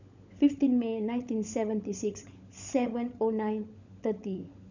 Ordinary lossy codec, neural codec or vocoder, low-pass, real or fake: none; codec, 16 kHz, 16 kbps, FunCodec, trained on LibriTTS, 50 frames a second; 7.2 kHz; fake